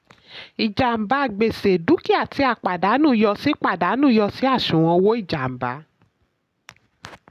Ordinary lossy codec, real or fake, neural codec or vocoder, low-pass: none; real; none; 14.4 kHz